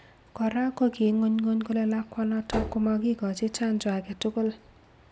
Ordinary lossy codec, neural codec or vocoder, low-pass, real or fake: none; none; none; real